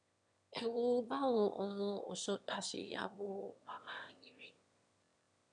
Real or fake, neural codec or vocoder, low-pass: fake; autoencoder, 22.05 kHz, a latent of 192 numbers a frame, VITS, trained on one speaker; 9.9 kHz